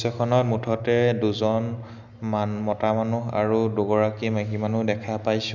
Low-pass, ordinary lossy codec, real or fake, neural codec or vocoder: 7.2 kHz; none; real; none